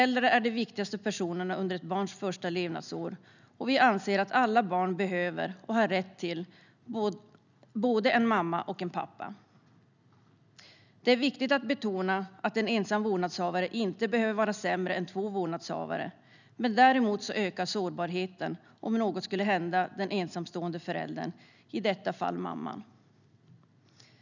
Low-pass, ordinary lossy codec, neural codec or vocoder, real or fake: 7.2 kHz; none; none; real